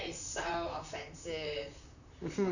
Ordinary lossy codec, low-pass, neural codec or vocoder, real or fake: none; 7.2 kHz; vocoder, 44.1 kHz, 128 mel bands, Pupu-Vocoder; fake